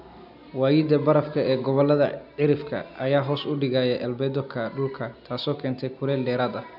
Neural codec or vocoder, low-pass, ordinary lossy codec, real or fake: none; 5.4 kHz; none; real